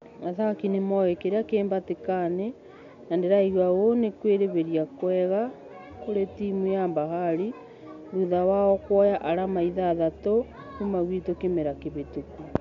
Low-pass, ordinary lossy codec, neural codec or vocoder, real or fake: 7.2 kHz; MP3, 64 kbps; none; real